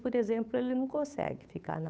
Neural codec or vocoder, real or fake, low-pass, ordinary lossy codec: codec, 16 kHz, 8 kbps, FunCodec, trained on Chinese and English, 25 frames a second; fake; none; none